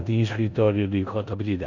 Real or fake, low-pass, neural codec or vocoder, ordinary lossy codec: fake; 7.2 kHz; codec, 16 kHz in and 24 kHz out, 0.9 kbps, LongCat-Audio-Codec, four codebook decoder; none